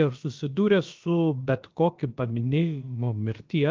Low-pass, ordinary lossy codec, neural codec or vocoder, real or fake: 7.2 kHz; Opus, 32 kbps; codec, 16 kHz, about 1 kbps, DyCAST, with the encoder's durations; fake